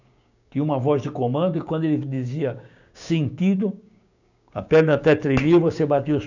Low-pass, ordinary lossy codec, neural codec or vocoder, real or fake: 7.2 kHz; none; codec, 16 kHz, 6 kbps, DAC; fake